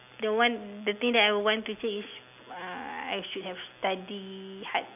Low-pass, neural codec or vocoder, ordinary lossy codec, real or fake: 3.6 kHz; none; none; real